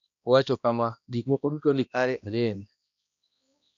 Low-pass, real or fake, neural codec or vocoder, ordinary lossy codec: 7.2 kHz; fake; codec, 16 kHz, 1 kbps, X-Codec, HuBERT features, trained on balanced general audio; none